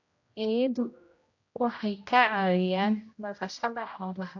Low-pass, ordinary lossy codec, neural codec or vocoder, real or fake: 7.2 kHz; AAC, 48 kbps; codec, 16 kHz, 0.5 kbps, X-Codec, HuBERT features, trained on general audio; fake